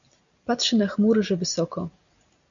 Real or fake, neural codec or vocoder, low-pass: real; none; 7.2 kHz